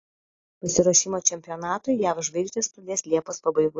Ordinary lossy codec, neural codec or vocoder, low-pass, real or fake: AAC, 32 kbps; none; 7.2 kHz; real